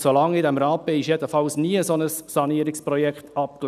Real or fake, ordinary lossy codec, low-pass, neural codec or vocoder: real; none; 14.4 kHz; none